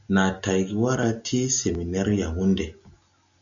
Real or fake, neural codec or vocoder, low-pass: real; none; 7.2 kHz